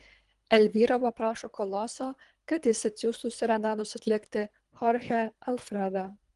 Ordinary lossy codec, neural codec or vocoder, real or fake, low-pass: Opus, 24 kbps; codec, 24 kHz, 3 kbps, HILCodec; fake; 10.8 kHz